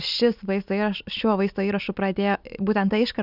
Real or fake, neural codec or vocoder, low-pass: real; none; 5.4 kHz